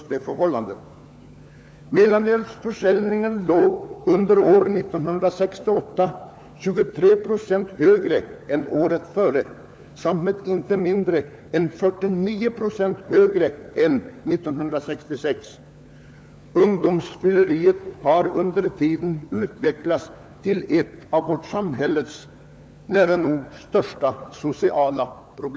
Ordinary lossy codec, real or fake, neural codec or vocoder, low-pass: none; fake; codec, 16 kHz, 4 kbps, FunCodec, trained on LibriTTS, 50 frames a second; none